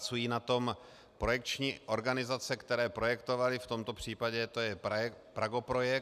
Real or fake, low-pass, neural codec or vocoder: real; 14.4 kHz; none